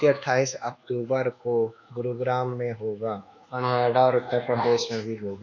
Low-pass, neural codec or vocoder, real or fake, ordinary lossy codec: 7.2 kHz; codec, 24 kHz, 1.2 kbps, DualCodec; fake; none